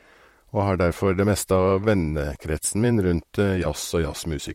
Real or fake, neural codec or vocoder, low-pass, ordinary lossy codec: fake; vocoder, 44.1 kHz, 128 mel bands, Pupu-Vocoder; 19.8 kHz; MP3, 64 kbps